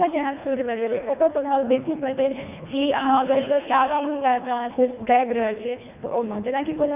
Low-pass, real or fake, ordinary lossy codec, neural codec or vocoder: 3.6 kHz; fake; none; codec, 24 kHz, 1.5 kbps, HILCodec